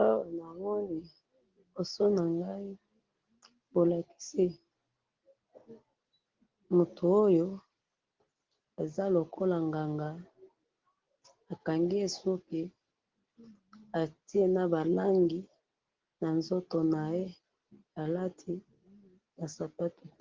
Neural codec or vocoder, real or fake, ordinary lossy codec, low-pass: none; real; Opus, 16 kbps; 7.2 kHz